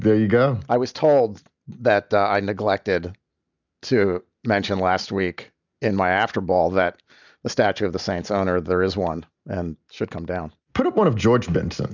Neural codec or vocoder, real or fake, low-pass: none; real; 7.2 kHz